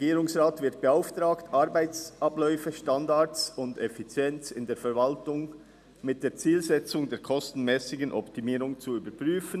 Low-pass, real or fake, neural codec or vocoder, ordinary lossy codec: 14.4 kHz; real; none; none